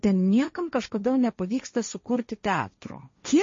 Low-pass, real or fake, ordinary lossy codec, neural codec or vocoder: 7.2 kHz; fake; MP3, 32 kbps; codec, 16 kHz, 1.1 kbps, Voila-Tokenizer